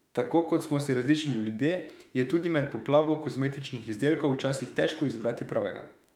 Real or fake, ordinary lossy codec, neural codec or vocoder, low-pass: fake; none; autoencoder, 48 kHz, 32 numbers a frame, DAC-VAE, trained on Japanese speech; 19.8 kHz